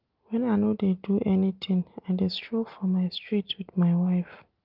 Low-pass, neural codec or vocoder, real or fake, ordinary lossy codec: 5.4 kHz; none; real; Opus, 24 kbps